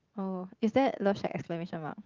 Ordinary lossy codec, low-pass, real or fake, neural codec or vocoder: Opus, 32 kbps; 7.2 kHz; fake; vocoder, 44.1 kHz, 80 mel bands, Vocos